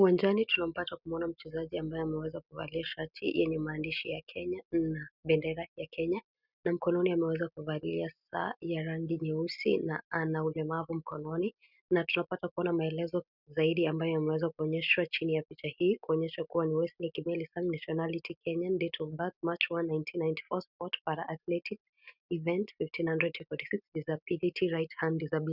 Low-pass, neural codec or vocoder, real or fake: 5.4 kHz; none; real